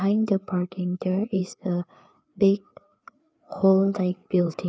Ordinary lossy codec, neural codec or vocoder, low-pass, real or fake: none; codec, 16 kHz, 4 kbps, FreqCodec, larger model; none; fake